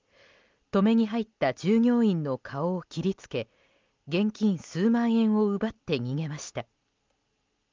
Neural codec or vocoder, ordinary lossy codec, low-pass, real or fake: none; Opus, 32 kbps; 7.2 kHz; real